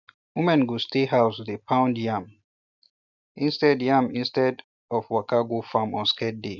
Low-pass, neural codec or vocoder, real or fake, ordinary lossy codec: 7.2 kHz; none; real; none